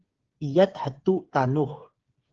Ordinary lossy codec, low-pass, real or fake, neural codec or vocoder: Opus, 16 kbps; 7.2 kHz; fake; codec, 16 kHz, 8 kbps, FreqCodec, smaller model